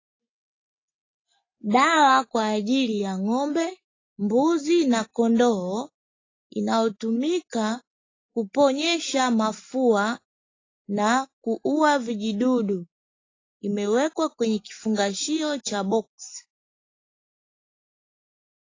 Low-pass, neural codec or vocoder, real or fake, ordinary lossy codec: 7.2 kHz; none; real; AAC, 32 kbps